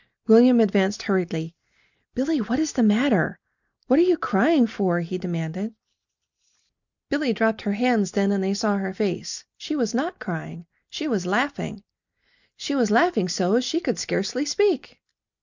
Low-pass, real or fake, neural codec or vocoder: 7.2 kHz; real; none